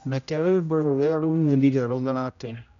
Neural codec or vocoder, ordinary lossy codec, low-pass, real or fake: codec, 16 kHz, 0.5 kbps, X-Codec, HuBERT features, trained on general audio; none; 7.2 kHz; fake